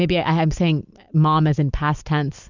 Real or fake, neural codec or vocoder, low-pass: real; none; 7.2 kHz